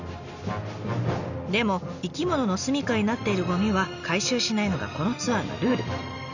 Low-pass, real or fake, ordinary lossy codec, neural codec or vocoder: 7.2 kHz; real; none; none